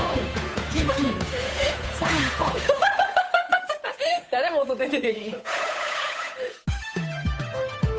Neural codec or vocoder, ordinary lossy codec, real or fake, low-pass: codec, 16 kHz, 8 kbps, FunCodec, trained on Chinese and English, 25 frames a second; none; fake; none